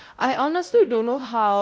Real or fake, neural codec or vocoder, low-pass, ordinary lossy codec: fake; codec, 16 kHz, 0.5 kbps, X-Codec, WavLM features, trained on Multilingual LibriSpeech; none; none